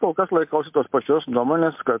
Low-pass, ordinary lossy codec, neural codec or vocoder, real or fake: 3.6 kHz; MP3, 32 kbps; none; real